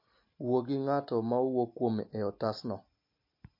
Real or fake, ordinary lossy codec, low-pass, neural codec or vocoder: real; MP3, 32 kbps; 5.4 kHz; none